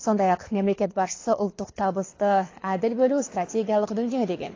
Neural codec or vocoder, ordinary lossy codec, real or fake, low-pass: codec, 24 kHz, 6 kbps, HILCodec; AAC, 32 kbps; fake; 7.2 kHz